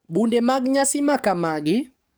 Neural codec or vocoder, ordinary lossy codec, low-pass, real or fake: codec, 44.1 kHz, 7.8 kbps, DAC; none; none; fake